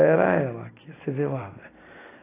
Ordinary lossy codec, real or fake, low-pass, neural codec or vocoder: AAC, 16 kbps; real; 3.6 kHz; none